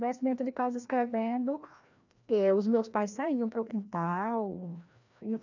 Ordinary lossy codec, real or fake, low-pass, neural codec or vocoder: none; fake; 7.2 kHz; codec, 16 kHz, 1 kbps, FreqCodec, larger model